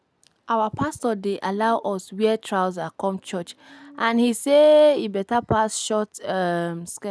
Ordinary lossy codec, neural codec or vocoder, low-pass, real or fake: none; none; none; real